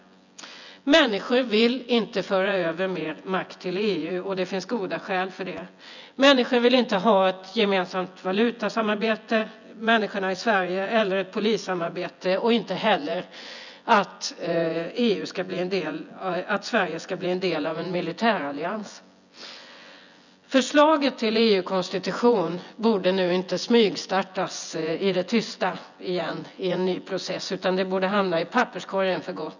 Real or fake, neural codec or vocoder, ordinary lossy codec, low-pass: fake; vocoder, 24 kHz, 100 mel bands, Vocos; none; 7.2 kHz